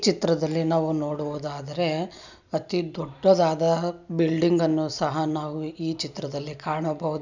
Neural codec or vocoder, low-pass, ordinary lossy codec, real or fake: none; 7.2 kHz; none; real